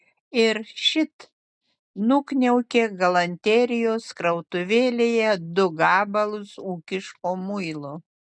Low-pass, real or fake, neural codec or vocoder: 9.9 kHz; real; none